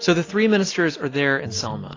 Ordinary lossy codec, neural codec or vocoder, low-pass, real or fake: AAC, 32 kbps; none; 7.2 kHz; real